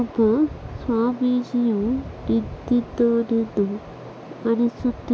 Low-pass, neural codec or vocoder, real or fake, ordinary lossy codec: none; none; real; none